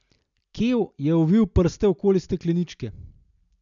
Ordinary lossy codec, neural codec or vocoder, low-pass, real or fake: none; none; 7.2 kHz; real